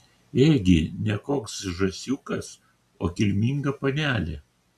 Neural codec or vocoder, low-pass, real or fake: none; 14.4 kHz; real